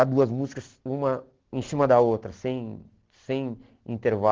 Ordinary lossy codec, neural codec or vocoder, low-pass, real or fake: Opus, 16 kbps; none; 7.2 kHz; real